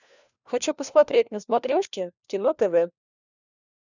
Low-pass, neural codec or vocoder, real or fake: 7.2 kHz; codec, 16 kHz, 1 kbps, FunCodec, trained on LibriTTS, 50 frames a second; fake